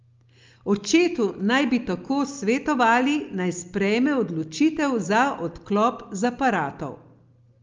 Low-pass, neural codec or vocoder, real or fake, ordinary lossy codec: 7.2 kHz; none; real; Opus, 24 kbps